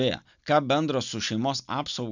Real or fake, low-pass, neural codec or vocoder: real; 7.2 kHz; none